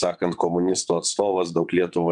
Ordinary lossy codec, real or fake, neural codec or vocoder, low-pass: MP3, 96 kbps; fake; vocoder, 22.05 kHz, 80 mel bands, WaveNeXt; 9.9 kHz